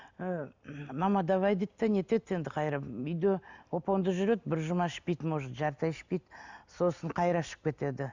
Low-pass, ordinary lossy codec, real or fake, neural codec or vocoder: 7.2 kHz; Opus, 64 kbps; real; none